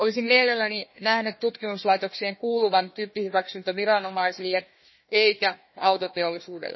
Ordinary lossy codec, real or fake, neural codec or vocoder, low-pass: MP3, 24 kbps; fake; codec, 16 kHz, 1 kbps, FunCodec, trained on Chinese and English, 50 frames a second; 7.2 kHz